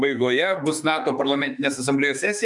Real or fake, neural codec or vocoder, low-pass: fake; autoencoder, 48 kHz, 32 numbers a frame, DAC-VAE, trained on Japanese speech; 10.8 kHz